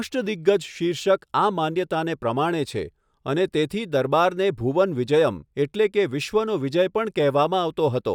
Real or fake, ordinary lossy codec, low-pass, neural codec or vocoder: real; none; 19.8 kHz; none